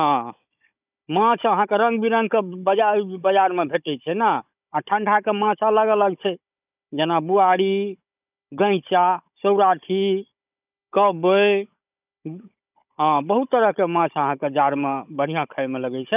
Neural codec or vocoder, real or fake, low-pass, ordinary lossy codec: codec, 16 kHz, 16 kbps, FunCodec, trained on Chinese and English, 50 frames a second; fake; 3.6 kHz; none